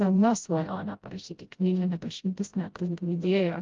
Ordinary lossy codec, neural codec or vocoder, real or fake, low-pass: Opus, 32 kbps; codec, 16 kHz, 0.5 kbps, FreqCodec, smaller model; fake; 7.2 kHz